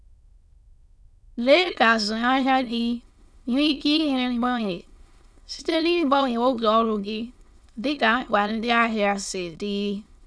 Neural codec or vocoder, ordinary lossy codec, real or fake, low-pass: autoencoder, 22.05 kHz, a latent of 192 numbers a frame, VITS, trained on many speakers; none; fake; none